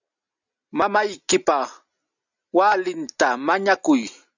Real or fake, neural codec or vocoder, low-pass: real; none; 7.2 kHz